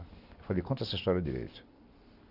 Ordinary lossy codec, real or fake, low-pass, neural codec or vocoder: Opus, 64 kbps; real; 5.4 kHz; none